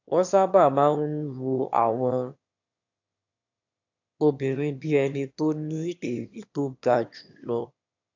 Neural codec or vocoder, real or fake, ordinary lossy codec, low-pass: autoencoder, 22.05 kHz, a latent of 192 numbers a frame, VITS, trained on one speaker; fake; AAC, 48 kbps; 7.2 kHz